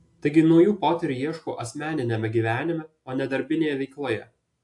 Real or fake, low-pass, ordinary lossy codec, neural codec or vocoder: real; 10.8 kHz; AAC, 64 kbps; none